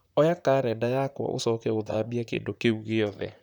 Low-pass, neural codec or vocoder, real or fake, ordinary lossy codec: 19.8 kHz; vocoder, 44.1 kHz, 128 mel bands, Pupu-Vocoder; fake; none